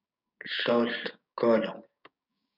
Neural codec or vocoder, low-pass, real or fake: codec, 16 kHz, 6 kbps, DAC; 5.4 kHz; fake